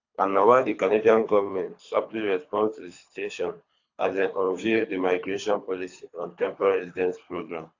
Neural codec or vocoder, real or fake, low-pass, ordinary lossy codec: codec, 24 kHz, 3 kbps, HILCodec; fake; 7.2 kHz; AAC, 48 kbps